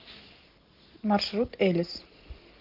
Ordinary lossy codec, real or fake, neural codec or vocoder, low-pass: Opus, 16 kbps; real; none; 5.4 kHz